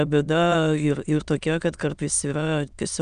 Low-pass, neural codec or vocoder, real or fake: 9.9 kHz; autoencoder, 22.05 kHz, a latent of 192 numbers a frame, VITS, trained on many speakers; fake